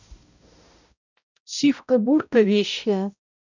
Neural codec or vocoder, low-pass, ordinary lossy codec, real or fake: codec, 16 kHz, 0.5 kbps, X-Codec, HuBERT features, trained on balanced general audio; 7.2 kHz; none; fake